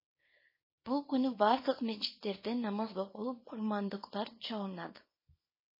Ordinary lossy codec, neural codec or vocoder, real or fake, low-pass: MP3, 24 kbps; codec, 24 kHz, 0.9 kbps, WavTokenizer, small release; fake; 5.4 kHz